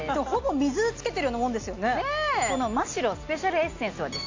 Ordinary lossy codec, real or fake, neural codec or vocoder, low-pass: AAC, 48 kbps; real; none; 7.2 kHz